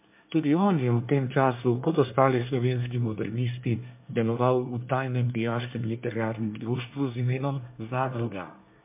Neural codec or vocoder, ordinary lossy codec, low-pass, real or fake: codec, 24 kHz, 1 kbps, SNAC; MP3, 32 kbps; 3.6 kHz; fake